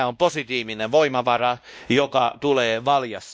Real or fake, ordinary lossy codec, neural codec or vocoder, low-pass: fake; none; codec, 16 kHz, 1 kbps, X-Codec, WavLM features, trained on Multilingual LibriSpeech; none